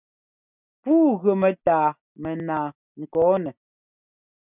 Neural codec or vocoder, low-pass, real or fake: none; 3.6 kHz; real